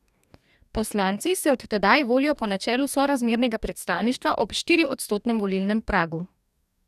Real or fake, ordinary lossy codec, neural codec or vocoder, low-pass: fake; none; codec, 44.1 kHz, 2.6 kbps, DAC; 14.4 kHz